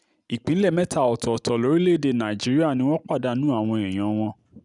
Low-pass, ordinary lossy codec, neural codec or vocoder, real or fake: 10.8 kHz; none; none; real